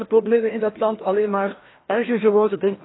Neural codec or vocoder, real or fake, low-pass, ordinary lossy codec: codec, 16 kHz, 1 kbps, FreqCodec, larger model; fake; 7.2 kHz; AAC, 16 kbps